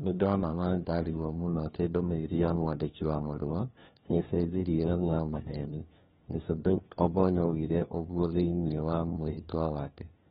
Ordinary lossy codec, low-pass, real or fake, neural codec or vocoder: AAC, 16 kbps; 7.2 kHz; fake; codec, 16 kHz, 1 kbps, FunCodec, trained on LibriTTS, 50 frames a second